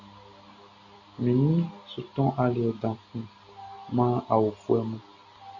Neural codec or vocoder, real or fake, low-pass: none; real; 7.2 kHz